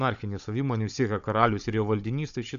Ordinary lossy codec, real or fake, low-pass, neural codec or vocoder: AAC, 96 kbps; fake; 7.2 kHz; codec, 16 kHz, 16 kbps, FunCodec, trained on Chinese and English, 50 frames a second